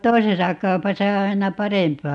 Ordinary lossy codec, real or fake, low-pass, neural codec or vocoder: none; real; 10.8 kHz; none